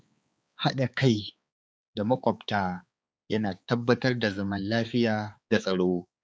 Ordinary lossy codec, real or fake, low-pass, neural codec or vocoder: none; fake; none; codec, 16 kHz, 4 kbps, X-Codec, HuBERT features, trained on balanced general audio